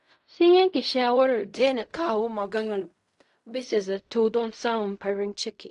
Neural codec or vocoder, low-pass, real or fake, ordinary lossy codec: codec, 16 kHz in and 24 kHz out, 0.4 kbps, LongCat-Audio-Codec, fine tuned four codebook decoder; 10.8 kHz; fake; AAC, 64 kbps